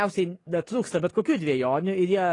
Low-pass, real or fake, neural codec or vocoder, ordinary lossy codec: 10.8 kHz; fake; codec, 44.1 kHz, 7.8 kbps, DAC; AAC, 32 kbps